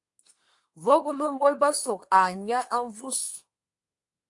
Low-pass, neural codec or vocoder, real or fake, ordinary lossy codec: 10.8 kHz; codec, 24 kHz, 1 kbps, SNAC; fake; AAC, 64 kbps